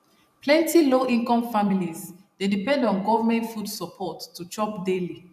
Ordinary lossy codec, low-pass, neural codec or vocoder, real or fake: none; 14.4 kHz; vocoder, 44.1 kHz, 128 mel bands every 512 samples, BigVGAN v2; fake